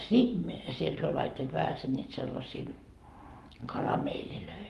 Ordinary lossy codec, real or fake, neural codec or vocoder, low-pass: Opus, 32 kbps; fake; codec, 44.1 kHz, 7.8 kbps, Pupu-Codec; 14.4 kHz